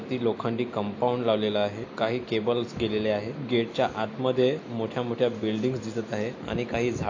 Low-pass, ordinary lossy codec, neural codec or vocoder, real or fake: 7.2 kHz; AAC, 48 kbps; none; real